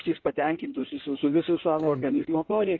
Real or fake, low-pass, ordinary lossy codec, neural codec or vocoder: fake; 7.2 kHz; AAC, 32 kbps; codec, 16 kHz in and 24 kHz out, 1.1 kbps, FireRedTTS-2 codec